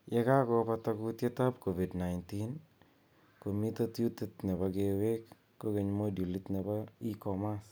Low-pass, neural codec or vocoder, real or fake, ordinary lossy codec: none; none; real; none